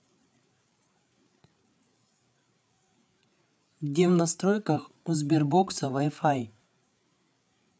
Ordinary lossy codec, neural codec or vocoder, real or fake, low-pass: none; codec, 16 kHz, 8 kbps, FreqCodec, larger model; fake; none